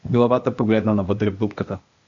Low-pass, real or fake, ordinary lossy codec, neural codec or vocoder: 7.2 kHz; fake; MP3, 64 kbps; codec, 16 kHz, 0.8 kbps, ZipCodec